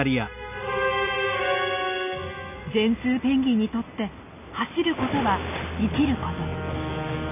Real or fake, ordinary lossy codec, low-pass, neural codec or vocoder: real; none; 3.6 kHz; none